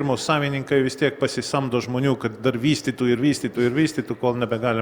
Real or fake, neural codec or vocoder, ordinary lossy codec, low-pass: real; none; Opus, 64 kbps; 19.8 kHz